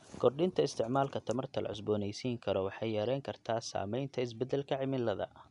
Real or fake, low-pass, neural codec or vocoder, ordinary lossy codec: real; 10.8 kHz; none; none